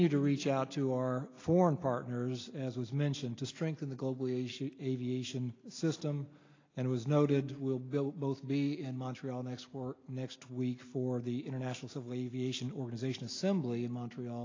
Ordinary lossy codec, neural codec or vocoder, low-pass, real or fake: AAC, 32 kbps; none; 7.2 kHz; real